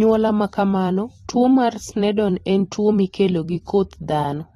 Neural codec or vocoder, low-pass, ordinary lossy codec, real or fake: vocoder, 44.1 kHz, 128 mel bands every 256 samples, BigVGAN v2; 19.8 kHz; AAC, 32 kbps; fake